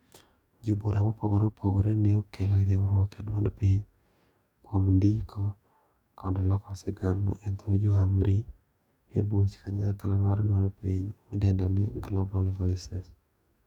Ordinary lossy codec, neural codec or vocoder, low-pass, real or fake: none; codec, 44.1 kHz, 2.6 kbps, DAC; 19.8 kHz; fake